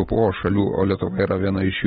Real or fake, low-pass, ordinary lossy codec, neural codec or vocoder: real; 19.8 kHz; AAC, 16 kbps; none